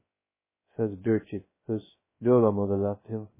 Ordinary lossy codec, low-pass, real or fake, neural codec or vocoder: MP3, 16 kbps; 3.6 kHz; fake; codec, 16 kHz, 0.2 kbps, FocalCodec